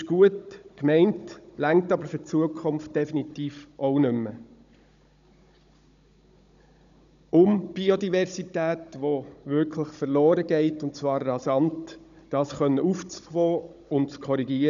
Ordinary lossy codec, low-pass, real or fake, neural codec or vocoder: none; 7.2 kHz; fake; codec, 16 kHz, 16 kbps, FunCodec, trained on Chinese and English, 50 frames a second